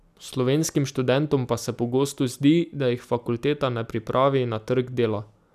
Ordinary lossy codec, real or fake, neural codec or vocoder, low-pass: none; real; none; 14.4 kHz